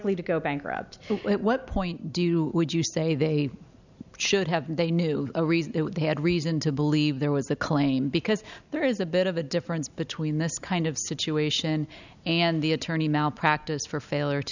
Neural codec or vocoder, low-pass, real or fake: none; 7.2 kHz; real